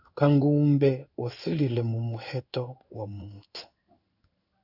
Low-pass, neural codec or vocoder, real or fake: 5.4 kHz; codec, 16 kHz in and 24 kHz out, 1 kbps, XY-Tokenizer; fake